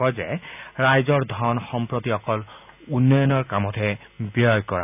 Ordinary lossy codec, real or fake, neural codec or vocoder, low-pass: none; real; none; 3.6 kHz